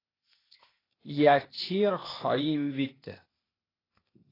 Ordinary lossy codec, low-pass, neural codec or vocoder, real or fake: AAC, 24 kbps; 5.4 kHz; codec, 16 kHz, 0.8 kbps, ZipCodec; fake